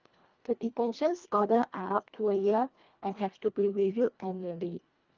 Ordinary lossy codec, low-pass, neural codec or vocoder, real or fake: Opus, 32 kbps; 7.2 kHz; codec, 24 kHz, 1.5 kbps, HILCodec; fake